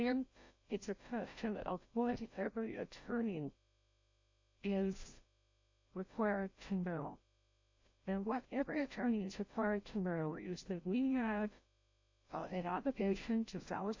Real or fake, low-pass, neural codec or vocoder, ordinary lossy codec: fake; 7.2 kHz; codec, 16 kHz, 0.5 kbps, FreqCodec, larger model; MP3, 48 kbps